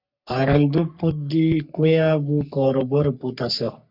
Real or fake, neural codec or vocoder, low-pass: fake; codec, 44.1 kHz, 3.4 kbps, Pupu-Codec; 5.4 kHz